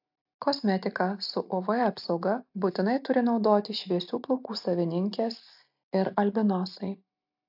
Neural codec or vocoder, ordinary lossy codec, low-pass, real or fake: none; AAC, 48 kbps; 5.4 kHz; real